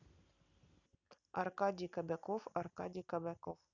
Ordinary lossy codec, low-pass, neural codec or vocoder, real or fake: none; 7.2 kHz; none; real